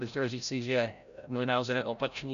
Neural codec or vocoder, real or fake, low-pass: codec, 16 kHz, 0.5 kbps, FreqCodec, larger model; fake; 7.2 kHz